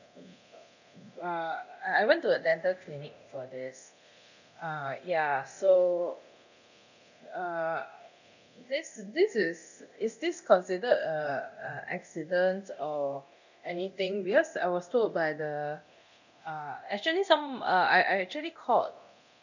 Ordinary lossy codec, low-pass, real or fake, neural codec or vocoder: none; 7.2 kHz; fake; codec, 24 kHz, 0.9 kbps, DualCodec